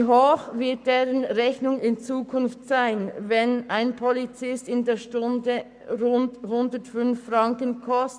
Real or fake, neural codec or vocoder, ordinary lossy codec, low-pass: fake; codec, 44.1 kHz, 7.8 kbps, Pupu-Codec; none; 9.9 kHz